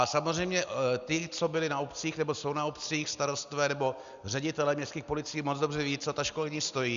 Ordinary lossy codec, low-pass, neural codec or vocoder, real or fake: Opus, 64 kbps; 7.2 kHz; none; real